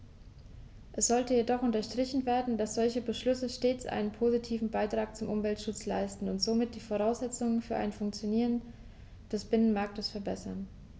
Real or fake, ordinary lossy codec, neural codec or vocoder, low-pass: real; none; none; none